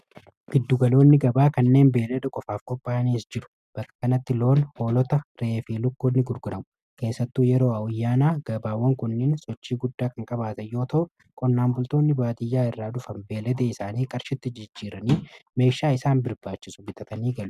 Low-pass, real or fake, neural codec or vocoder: 14.4 kHz; real; none